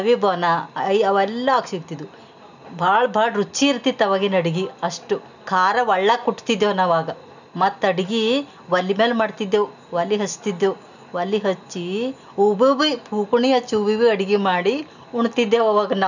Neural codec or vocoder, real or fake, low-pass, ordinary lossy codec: none; real; 7.2 kHz; none